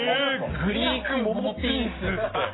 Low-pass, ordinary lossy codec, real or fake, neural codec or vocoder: 7.2 kHz; AAC, 16 kbps; real; none